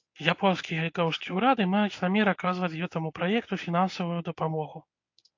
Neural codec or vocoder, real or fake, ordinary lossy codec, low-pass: codec, 24 kHz, 0.9 kbps, WavTokenizer, medium speech release version 1; fake; AAC, 48 kbps; 7.2 kHz